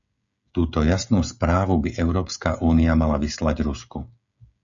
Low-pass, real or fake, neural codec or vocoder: 7.2 kHz; fake; codec, 16 kHz, 16 kbps, FreqCodec, smaller model